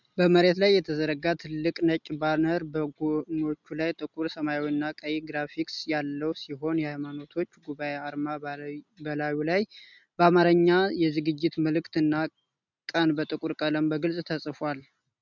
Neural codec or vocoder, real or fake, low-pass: none; real; 7.2 kHz